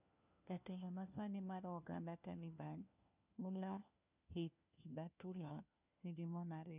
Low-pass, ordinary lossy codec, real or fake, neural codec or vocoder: 3.6 kHz; none; fake; codec, 16 kHz, 1 kbps, FunCodec, trained on LibriTTS, 50 frames a second